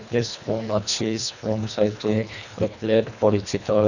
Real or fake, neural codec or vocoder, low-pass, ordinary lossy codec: fake; codec, 24 kHz, 1.5 kbps, HILCodec; 7.2 kHz; none